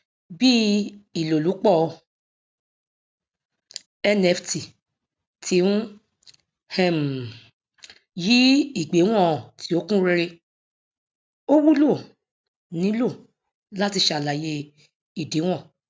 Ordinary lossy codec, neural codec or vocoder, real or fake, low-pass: none; none; real; none